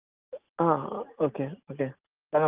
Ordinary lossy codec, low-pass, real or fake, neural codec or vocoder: Opus, 24 kbps; 3.6 kHz; real; none